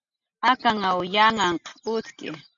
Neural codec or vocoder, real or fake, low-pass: none; real; 7.2 kHz